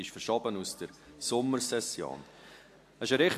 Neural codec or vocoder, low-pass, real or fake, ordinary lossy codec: none; 14.4 kHz; real; AAC, 64 kbps